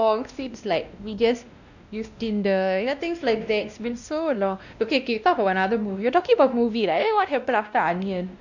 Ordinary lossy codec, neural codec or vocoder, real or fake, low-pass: none; codec, 16 kHz, 1 kbps, X-Codec, WavLM features, trained on Multilingual LibriSpeech; fake; 7.2 kHz